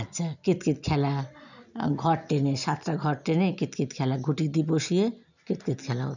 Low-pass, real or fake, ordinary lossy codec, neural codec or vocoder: 7.2 kHz; real; none; none